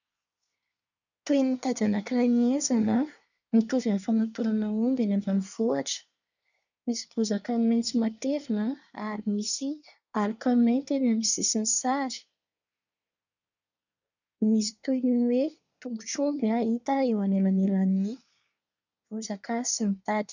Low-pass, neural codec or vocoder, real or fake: 7.2 kHz; codec, 24 kHz, 1 kbps, SNAC; fake